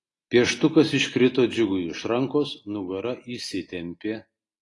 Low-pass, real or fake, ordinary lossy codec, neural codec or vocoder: 10.8 kHz; real; AAC, 32 kbps; none